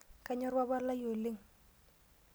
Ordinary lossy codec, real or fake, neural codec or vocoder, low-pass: none; real; none; none